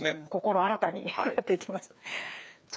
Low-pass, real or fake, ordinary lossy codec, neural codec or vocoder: none; fake; none; codec, 16 kHz, 2 kbps, FreqCodec, larger model